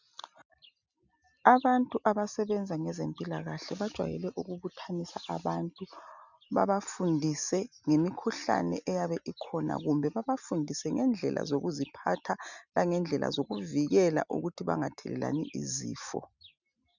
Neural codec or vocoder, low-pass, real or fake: none; 7.2 kHz; real